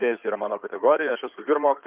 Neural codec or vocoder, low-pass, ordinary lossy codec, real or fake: codec, 16 kHz in and 24 kHz out, 2.2 kbps, FireRedTTS-2 codec; 3.6 kHz; Opus, 24 kbps; fake